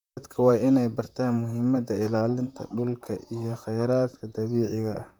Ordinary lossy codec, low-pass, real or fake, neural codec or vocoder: MP3, 96 kbps; 19.8 kHz; fake; vocoder, 44.1 kHz, 128 mel bands, Pupu-Vocoder